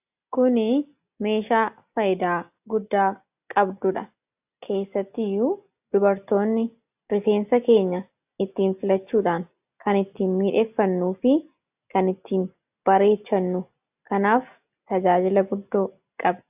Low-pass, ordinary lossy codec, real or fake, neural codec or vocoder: 3.6 kHz; AAC, 32 kbps; real; none